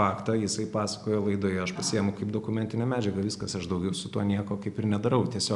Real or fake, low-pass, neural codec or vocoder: real; 10.8 kHz; none